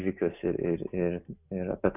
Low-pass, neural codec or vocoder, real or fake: 3.6 kHz; none; real